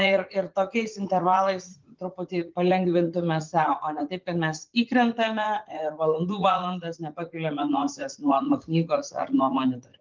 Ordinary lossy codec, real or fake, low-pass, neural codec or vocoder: Opus, 32 kbps; fake; 7.2 kHz; vocoder, 44.1 kHz, 80 mel bands, Vocos